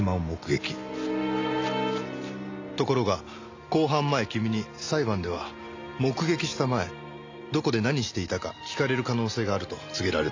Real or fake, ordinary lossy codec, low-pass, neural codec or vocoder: real; AAC, 48 kbps; 7.2 kHz; none